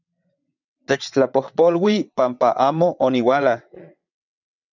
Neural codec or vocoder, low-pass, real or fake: vocoder, 22.05 kHz, 80 mel bands, WaveNeXt; 7.2 kHz; fake